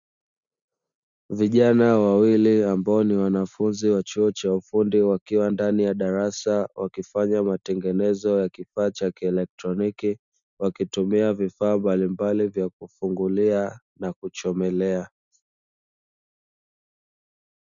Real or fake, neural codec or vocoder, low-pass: real; none; 7.2 kHz